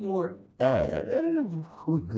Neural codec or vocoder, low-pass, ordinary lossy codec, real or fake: codec, 16 kHz, 1 kbps, FreqCodec, smaller model; none; none; fake